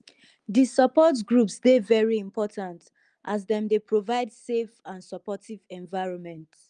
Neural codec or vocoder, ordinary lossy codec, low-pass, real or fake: none; Opus, 32 kbps; 9.9 kHz; real